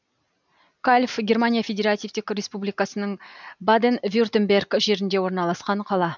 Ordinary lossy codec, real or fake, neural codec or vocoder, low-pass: none; real; none; 7.2 kHz